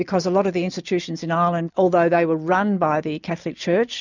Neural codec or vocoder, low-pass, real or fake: none; 7.2 kHz; real